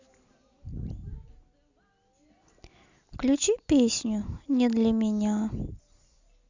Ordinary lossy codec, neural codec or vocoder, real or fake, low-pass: Opus, 64 kbps; none; real; 7.2 kHz